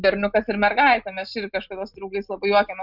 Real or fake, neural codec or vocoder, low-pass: real; none; 5.4 kHz